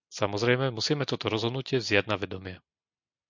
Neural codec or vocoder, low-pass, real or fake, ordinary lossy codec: none; 7.2 kHz; real; MP3, 64 kbps